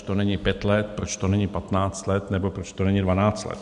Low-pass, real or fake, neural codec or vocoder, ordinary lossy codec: 10.8 kHz; real; none; MP3, 64 kbps